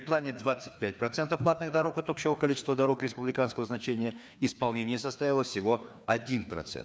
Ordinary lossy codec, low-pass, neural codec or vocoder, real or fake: none; none; codec, 16 kHz, 2 kbps, FreqCodec, larger model; fake